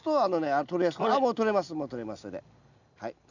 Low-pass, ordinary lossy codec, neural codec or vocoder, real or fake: 7.2 kHz; none; none; real